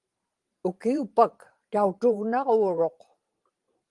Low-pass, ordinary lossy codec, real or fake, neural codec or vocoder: 10.8 kHz; Opus, 24 kbps; fake; vocoder, 44.1 kHz, 128 mel bands every 512 samples, BigVGAN v2